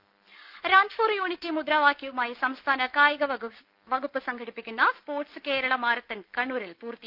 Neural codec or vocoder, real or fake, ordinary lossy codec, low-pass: none; real; Opus, 24 kbps; 5.4 kHz